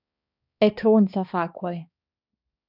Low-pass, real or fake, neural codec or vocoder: 5.4 kHz; fake; codec, 16 kHz, 2 kbps, X-Codec, HuBERT features, trained on balanced general audio